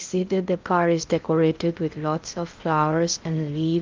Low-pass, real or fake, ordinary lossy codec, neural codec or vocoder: 7.2 kHz; fake; Opus, 24 kbps; codec, 16 kHz in and 24 kHz out, 0.6 kbps, FocalCodec, streaming, 2048 codes